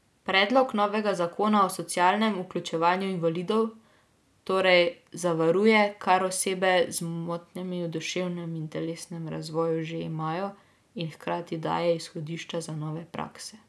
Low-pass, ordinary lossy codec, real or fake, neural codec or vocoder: none; none; real; none